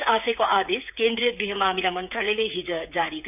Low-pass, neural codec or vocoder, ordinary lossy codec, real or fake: 3.6 kHz; codec, 16 kHz, 8 kbps, FreqCodec, smaller model; none; fake